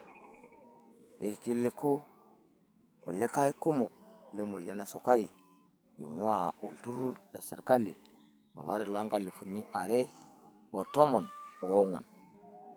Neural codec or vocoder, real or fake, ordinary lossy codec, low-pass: codec, 44.1 kHz, 2.6 kbps, SNAC; fake; none; none